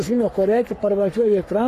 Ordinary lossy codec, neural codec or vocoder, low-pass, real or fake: AAC, 48 kbps; codec, 44.1 kHz, 3.4 kbps, Pupu-Codec; 14.4 kHz; fake